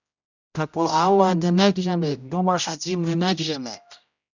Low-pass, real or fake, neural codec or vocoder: 7.2 kHz; fake; codec, 16 kHz, 0.5 kbps, X-Codec, HuBERT features, trained on general audio